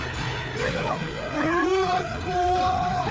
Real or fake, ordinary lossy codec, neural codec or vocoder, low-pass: fake; none; codec, 16 kHz, 4 kbps, FreqCodec, larger model; none